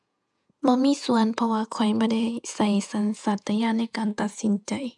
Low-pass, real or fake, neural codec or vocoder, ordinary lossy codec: 10.8 kHz; fake; vocoder, 48 kHz, 128 mel bands, Vocos; none